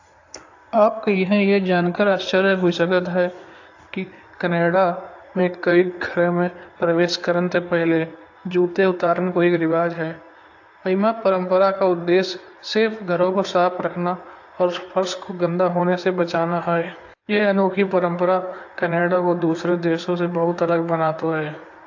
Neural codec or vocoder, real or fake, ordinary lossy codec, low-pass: codec, 16 kHz in and 24 kHz out, 2.2 kbps, FireRedTTS-2 codec; fake; AAC, 48 kbps; 7.2 kHz